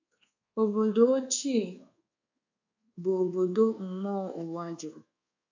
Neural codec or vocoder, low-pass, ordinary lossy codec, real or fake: codec, 24 kHz, 1.2 kbps, DualCodec; 7.2 kHz; AAC, 48 kbps; fake